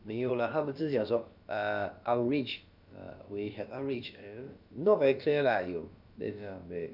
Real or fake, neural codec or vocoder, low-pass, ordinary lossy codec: fake; codec, 16 kHz, about 1 kbps, DyCAST, with the encoder's durations; 5.4 kHz; none